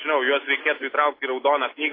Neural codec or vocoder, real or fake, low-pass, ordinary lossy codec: none; real; 5.4 kHz; AAC, 24 kbps